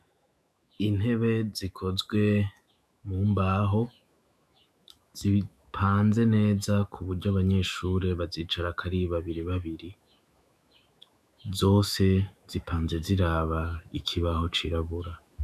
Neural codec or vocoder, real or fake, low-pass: autoencoder, 48 kHz, 128 numbers a frame, DAC-VAE, trained on Japanese speech; fake; 14.4 kHz